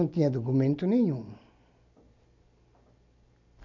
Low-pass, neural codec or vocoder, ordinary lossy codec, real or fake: 7.2 kHz; none; none; real